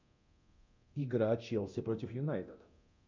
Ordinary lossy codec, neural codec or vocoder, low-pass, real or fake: MP3, 64 kbps; codec, 24 kHz, 0.9 kbps, DualCodec; 7.2 kHz; fake